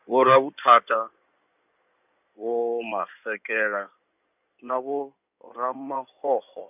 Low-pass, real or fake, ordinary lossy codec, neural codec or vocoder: 3.6 kHz; fake; none; codec, 16 kHz in and 24 kHz out, 2.2 kbps, FireRedTTS-2 codec